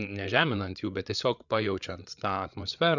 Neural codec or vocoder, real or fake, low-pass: codec, 16 kHz, 8 kbps, FreqCodec, larger model; fake; 7.2 kHz